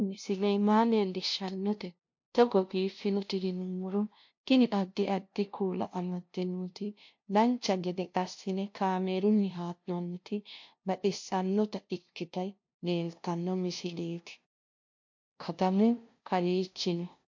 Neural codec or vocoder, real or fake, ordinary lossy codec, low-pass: codec, 16 kHz, 0.5 kbps, FunCodec, trained on LibriTTS, 25 frames a second; fake; MP3, 48 kbps; 7.2 kHz